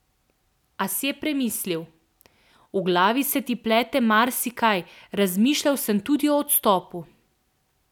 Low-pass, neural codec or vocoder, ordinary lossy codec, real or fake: 19.8 kHz; none; none; real